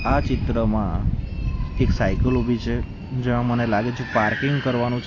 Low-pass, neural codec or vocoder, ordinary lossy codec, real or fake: 7.2 kHz; none; AAC, 32 kbps; real